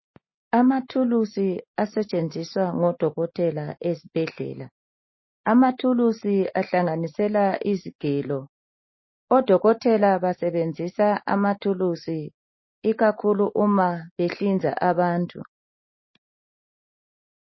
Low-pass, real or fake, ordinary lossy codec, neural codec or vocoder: 7.2 kHz; real; MP3, 24 kbps; none